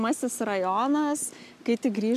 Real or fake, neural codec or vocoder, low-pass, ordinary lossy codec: real; none; 14.4 kHz; MP3, 96 kbps